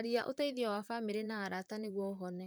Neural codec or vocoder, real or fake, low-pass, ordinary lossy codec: vocoder, 44.1 kHz, 128 mel bands, Pupu-Vocoder; fake; none; none